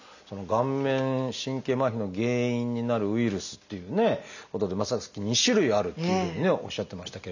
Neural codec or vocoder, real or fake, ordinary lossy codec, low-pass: none; real; none; 7.2 kHz